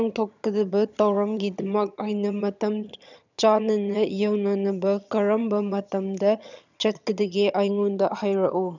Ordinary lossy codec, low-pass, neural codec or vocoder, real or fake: none; 7.2 kHz; vocoder, 22.05 kHz, 80 mel bands, HiFi-GAN; fake